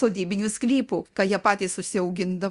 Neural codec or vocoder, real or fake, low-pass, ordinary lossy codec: codec, 24 kHz, 0.9 kbps, DualCodec; fake; 10.8 kHz; Opus, 64 kbps